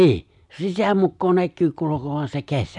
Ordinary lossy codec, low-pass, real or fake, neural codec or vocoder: none; 10.8 kHz; real; none